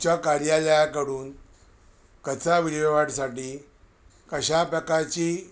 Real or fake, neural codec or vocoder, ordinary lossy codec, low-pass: real; none; none; none